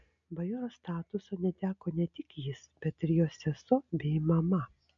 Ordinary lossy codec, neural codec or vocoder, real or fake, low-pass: MP3, 64 kbps; none; real; 7.2 kHz